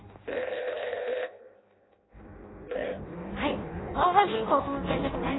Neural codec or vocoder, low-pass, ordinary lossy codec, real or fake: codec, 16 kHz in and 24 kHz out, 0.6 kbps, FireRedTTS-2 codec; 7.2 kHz; AAC, 16 kbps; fake